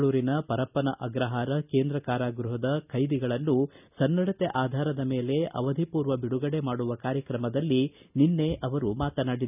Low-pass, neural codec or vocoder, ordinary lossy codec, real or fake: 3.6 kHz; none; none; real